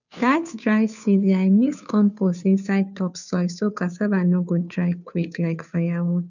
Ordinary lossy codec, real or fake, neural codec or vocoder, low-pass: none; fake; codec, 16 kHz, 2 kbps, FunCodec, trained on Chinese and English, 25 frames a second; 7.2 kHz